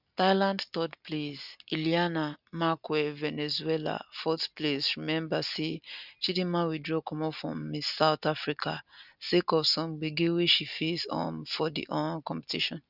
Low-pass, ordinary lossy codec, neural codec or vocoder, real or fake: 5.4 kHz; none; none; real